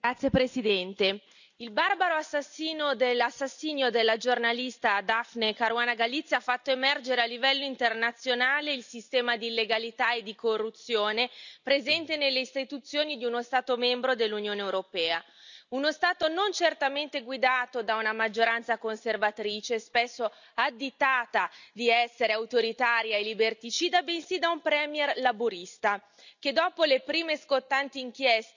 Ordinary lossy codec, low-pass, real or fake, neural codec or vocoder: none; 7.2 kHz; real; none